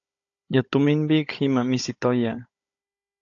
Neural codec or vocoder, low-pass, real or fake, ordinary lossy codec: codec, 16 kHz, 16 kbps, FunCodec, trained on Chinese and English, 50 frames a second; 7.2 kHz; fake; AAC, 48 kbps